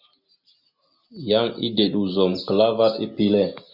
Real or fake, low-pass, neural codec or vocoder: real; 5.4 kHz; none